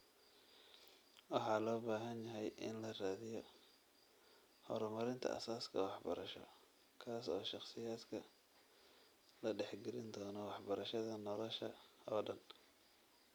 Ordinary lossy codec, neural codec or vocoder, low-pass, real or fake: none; none; none; real